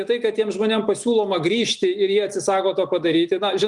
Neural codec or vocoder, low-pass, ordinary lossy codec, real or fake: none; 10.8 kHz; Opus, 32 kbps; real